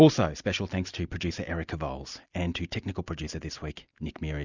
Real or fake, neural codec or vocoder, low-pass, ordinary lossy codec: real; none; 7.2 kHz; Opus, 64 kbps